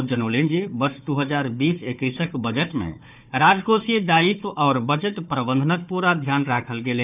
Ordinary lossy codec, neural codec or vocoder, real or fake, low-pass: none; codec, 16 kHz, 4 kbps, FunCodec, trained on Chinese and English, 50 frames a second; fake; 3.6 kHz